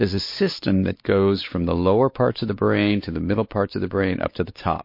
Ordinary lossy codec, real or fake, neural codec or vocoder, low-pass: MP3, 32 kbps; fake; codec, 16 kHz, 4 kbps, X-Codec, WavLM features, trained on Multilingual LibriSpeech; 5.4 kHz